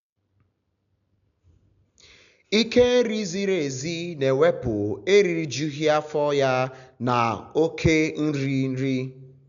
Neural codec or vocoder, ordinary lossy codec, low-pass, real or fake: none; MP3, 96 kbps; 7.2 kHz; real